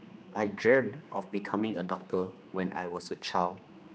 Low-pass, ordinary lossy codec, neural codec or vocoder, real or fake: none; none; codec, 16 kHz, 2 kbps, X-Codec, HuBERT features, trained on general audio; fake